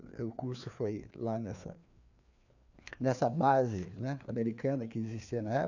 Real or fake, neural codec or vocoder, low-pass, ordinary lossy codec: fake; codec, 16 kHz, 2 kbps, FreqCodec, larger model; 7.2 kHz; none